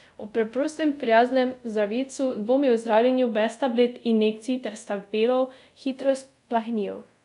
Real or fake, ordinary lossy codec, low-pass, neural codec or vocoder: fake; none; 10.8 kHz; codec, 24 kHz, 0.5 kbps, DualCodec